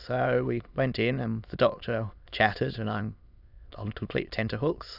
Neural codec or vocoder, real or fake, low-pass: autoencoder, 22.05 kHz, a latent of 192 numbers a frame, VITS, trained on many speakers; fake; 5.4 kHz